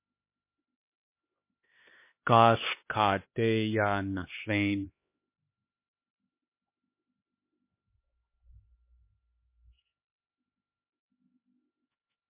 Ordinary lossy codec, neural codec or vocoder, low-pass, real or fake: MP3, 24 kbps; codec, 16 kHz, 2 kbps, X-Codec, HuBERT features, trained on LibriSpeech; 3.6 kHz; fake